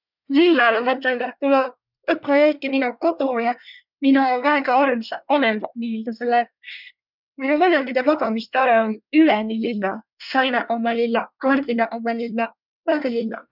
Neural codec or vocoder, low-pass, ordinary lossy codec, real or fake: codec, 24 kHz, 1 kbps, SNAC; 5.4 kHz; none; fake